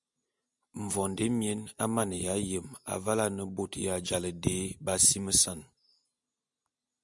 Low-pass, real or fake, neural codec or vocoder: 10.8 kHz; real; none